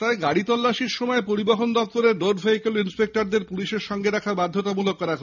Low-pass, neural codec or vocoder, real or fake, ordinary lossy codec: 7.2 kHz; none; real; none